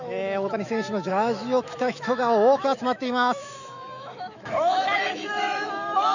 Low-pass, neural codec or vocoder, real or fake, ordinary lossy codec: 7.2 kHz; autoencoder, 48 kHz, 128 numbers a frame, DAC-VAE, trained on Japanese speech; fake; none